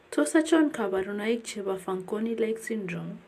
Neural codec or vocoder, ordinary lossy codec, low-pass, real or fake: none; AAC, 64 kbps; 14.4 kHz; real